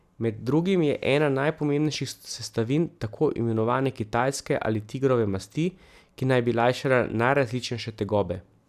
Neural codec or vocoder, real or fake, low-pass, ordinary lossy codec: none; real; 14.4 kHz; none